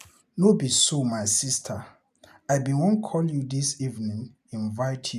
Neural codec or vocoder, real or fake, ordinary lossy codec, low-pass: none; real; none; 14.4 kHz